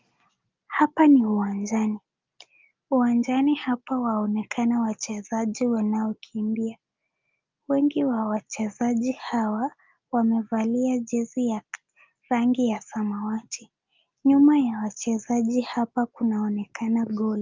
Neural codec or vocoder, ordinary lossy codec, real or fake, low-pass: none; Opus, 24 kbps; real; 7.2 kHz